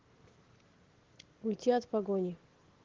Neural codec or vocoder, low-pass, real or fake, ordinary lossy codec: none; 7.2 kHz; real; Opus, 16 kbps